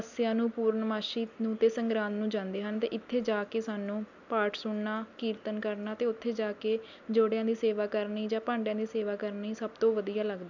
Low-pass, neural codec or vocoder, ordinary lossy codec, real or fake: 7.2 kHz; none; none; real